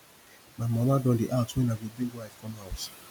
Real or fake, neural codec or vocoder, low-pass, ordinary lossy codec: real; none; none; none